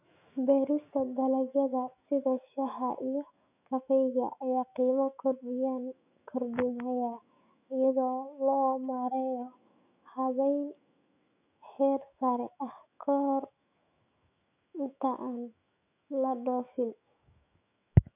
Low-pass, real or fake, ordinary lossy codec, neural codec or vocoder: 3.6 kHz; fake; none; autoencoder, 48 kHz, 128 numbers a frame, DAC-VAE, trained on Japanese speech